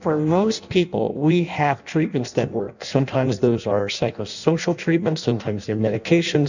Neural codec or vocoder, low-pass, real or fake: codec, 16 kHz in and 24 kHz out, 0.6 kbps, FireRedTTS-2 codec; 7.2 kHz; fake